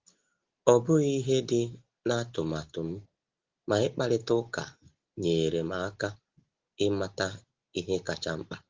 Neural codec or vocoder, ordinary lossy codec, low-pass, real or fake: none; Opus, 16 kbps; 7.2 kHz; real